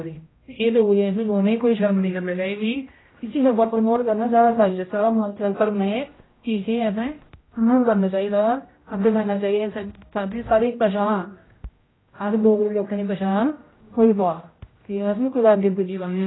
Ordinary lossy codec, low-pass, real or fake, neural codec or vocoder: AAC, 16 kbps; 7.2 kHz; fake; codec, 16 kHz, 0.5 kbps, X-Codec, HuBERT features, trained on general audio